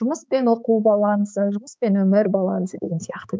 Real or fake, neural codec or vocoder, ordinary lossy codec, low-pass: fake; codec, 16 kHz, 4 kbps, X-Codec, HuBERT features, trained on balanced general audio; none; none